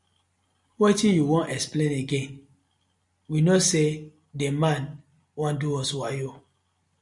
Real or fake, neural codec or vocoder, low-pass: real; none; 10.8 kHz